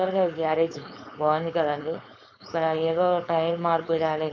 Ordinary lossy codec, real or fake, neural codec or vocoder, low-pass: none; fake; codec, 16 kHz, 4.8 kbps, FACodec; 7.2 kHz